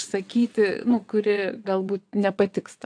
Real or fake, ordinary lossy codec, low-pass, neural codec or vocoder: fake; AAC, 64 kbps; 9.9 kHz; vocoder, 22.05 kHz, 80 mel bands, WaveNeXt